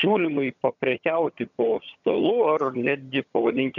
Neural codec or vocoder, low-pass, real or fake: vocoder, 22.05 kHz, 80 mel bands, HiFi-GAN; 7.2 kHz; fake